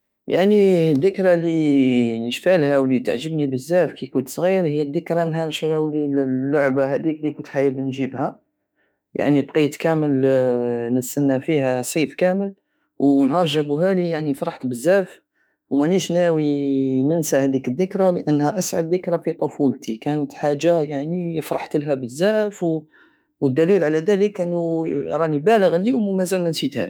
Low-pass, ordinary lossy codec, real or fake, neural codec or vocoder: none; none; fake; autoencoder, 48 kHz, 32 numbers a frame, DAC-VAE, trained on Japanese speech